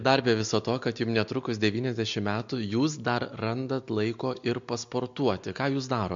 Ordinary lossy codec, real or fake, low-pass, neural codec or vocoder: MP3, 48 kbps; real; 7.2 kHz; none